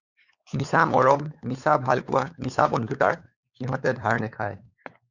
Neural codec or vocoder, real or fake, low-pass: codec, 16 kHz, 4 kbps, X-Codec, WavLM features, trained on Multilingual LibriSpeech; fake; 7.2 kHz